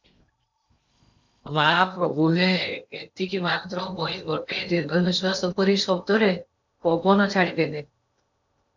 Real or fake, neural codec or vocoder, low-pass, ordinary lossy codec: fake; codec, 16 kHz in and 24 kHz out, 0.8 kbps, FocalCodec, streaming, 65536 codes; 7.2 kHz; MP3, 64 kbps